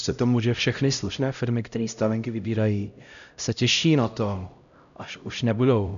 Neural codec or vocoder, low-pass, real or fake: codec, 16 kHz, 0.5 kbps, X-Codec, HuBERT features, trained on LibriSpeech; 7.2 kHz; fake